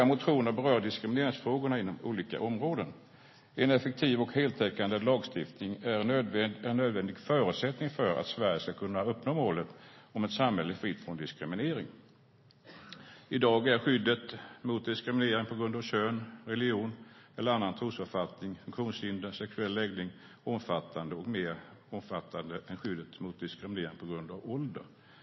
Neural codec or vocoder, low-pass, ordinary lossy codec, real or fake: none; 7.2 kHz; MP3, 24 kbps; real